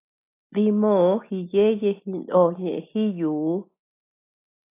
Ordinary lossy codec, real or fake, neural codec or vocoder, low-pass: AAC, 24 kbps; real; none; 3.6 kHz